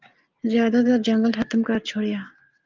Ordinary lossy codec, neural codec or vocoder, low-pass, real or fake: Opus, 24 kbps; none; 7.2 kHz; real